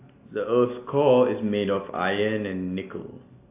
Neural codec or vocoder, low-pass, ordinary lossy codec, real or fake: none; 3.6 kHz; AAC, 24 kbps; real